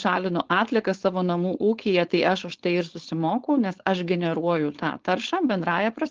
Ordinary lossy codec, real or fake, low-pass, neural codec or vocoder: Opus, 16 kbps; fake; 7.2 kHz; codec, 16 kHz, 4.8 kbps, FACodec